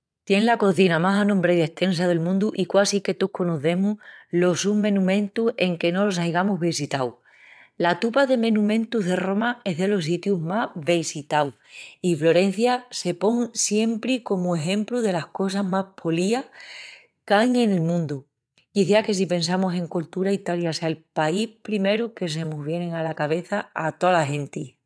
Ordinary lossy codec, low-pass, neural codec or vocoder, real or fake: none; none; vocoder, 22.05 kHz, 80 mel bands, WaveNeXt; fake